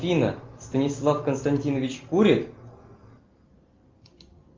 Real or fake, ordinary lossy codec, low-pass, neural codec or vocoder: real; Opus, 24 kbps; 7.2 kHz; none